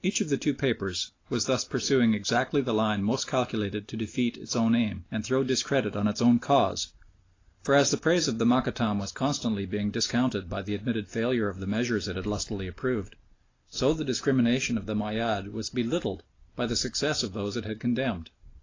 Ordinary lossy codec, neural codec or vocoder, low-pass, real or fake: AAC, 32 kbps; none; 7.2 kHz; real